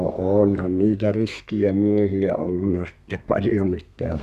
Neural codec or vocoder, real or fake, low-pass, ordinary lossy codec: codec, 32 kHz, 1.9 kbps, SNAC; fake; 14.4 kHz; none